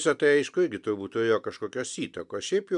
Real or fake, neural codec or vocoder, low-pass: real; none; 10.8 kHz